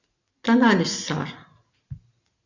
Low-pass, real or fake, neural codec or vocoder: 7.2 kHz; real; none